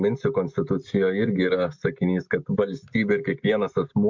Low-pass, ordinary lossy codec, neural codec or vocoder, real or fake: 7.2 kHz; MP3, 64 kbps; none; real